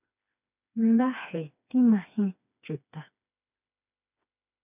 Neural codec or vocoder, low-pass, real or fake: codec, 16 kHz, 2 kbps, FreqCodec, smaller model; 3.6 kHz; fake